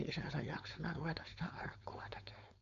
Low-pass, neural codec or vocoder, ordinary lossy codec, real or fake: 7.2 kHz; codec, 16 kHz, 4.8 kbps, FACodec; none; fake